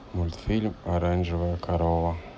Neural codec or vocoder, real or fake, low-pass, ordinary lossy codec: none; real; none; none